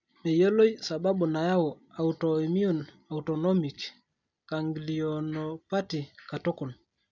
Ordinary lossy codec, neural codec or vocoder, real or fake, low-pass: none; none; real; 7.2 kHz